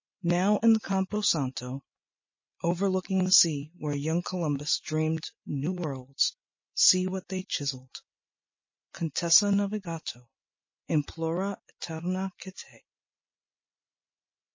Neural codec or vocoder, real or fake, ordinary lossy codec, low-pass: none; real; MP3, 32 kbps; 7.2 kHz